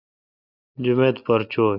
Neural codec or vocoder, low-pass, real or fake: none; 5.4 kHz; real